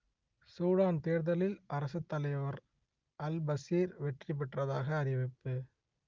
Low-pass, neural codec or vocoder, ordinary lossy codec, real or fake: 7.2 kHz; none; Opus, 32 kbps; real